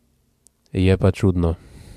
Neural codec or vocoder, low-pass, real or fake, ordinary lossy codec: none; 14.4 kHz; real; MP3, 96 kbps